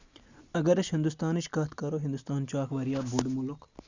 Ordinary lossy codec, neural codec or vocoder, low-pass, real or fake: Opus, 64 kbps; none; 7.2 kHz; real